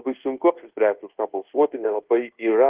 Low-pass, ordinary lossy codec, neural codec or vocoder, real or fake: 3.6 kHz; Opus, 16 kbps; codec, 24 kHz, 1.2 kbps, DualCodec; fake